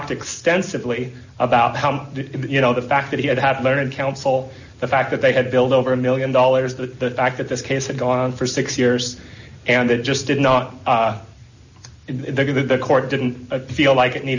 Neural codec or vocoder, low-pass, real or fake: none; 7.2 kHz; real